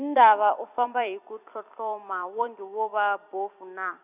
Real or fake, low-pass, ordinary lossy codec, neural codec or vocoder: fake; 3.6 kHz; none; vocoder, 44.1 kHz, 128 mel bands every 256 samples, BigVGAN v2